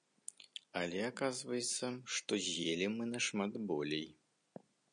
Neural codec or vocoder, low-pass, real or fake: none; 9.9 kHz; real